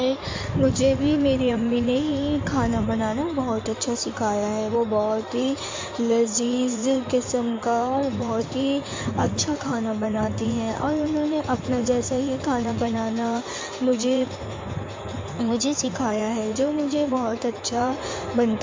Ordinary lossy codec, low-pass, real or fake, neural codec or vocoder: MP3, 48 kbps; 7.2 kHz; fake; codec, 16 kHz in and 24 kHz out, 2.2 kbps, FireRedTTS-2 codec